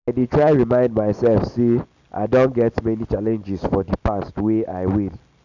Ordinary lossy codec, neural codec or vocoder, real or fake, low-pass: none; none; real; 7.2 kHz